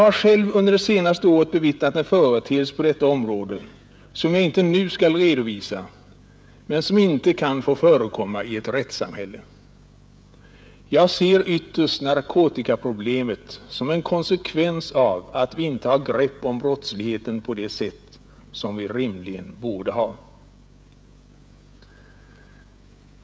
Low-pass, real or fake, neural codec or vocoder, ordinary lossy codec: none; fake; codec, 16 kHz, 16 kbps, FreqCodec, smaller model; none